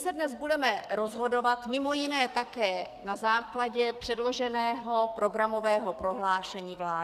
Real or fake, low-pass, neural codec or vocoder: fake; 14.4 kHz; codec, 44.1 kHz, 2.6 kbps, SNAC